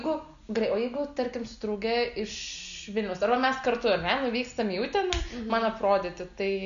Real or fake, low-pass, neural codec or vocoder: real; 7.2 kHz; none